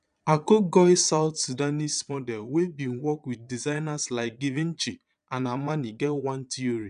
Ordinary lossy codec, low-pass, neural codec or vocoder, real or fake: none; 9.9 kHz; vocoder, 22.05 kHz, 80 mel bands, Vocos; fake